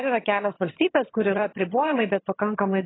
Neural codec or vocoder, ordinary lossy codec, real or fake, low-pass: vocoder, 22.05 kHz, 80 mel bands, HiFi-GAN; AAC, 16 kbps; fake; 7.2 kHz